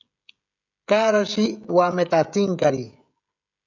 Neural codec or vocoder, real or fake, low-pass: codec, 16 kHz, 16 kbps, FreqCodec, smaller model; fake; 7.2 kHz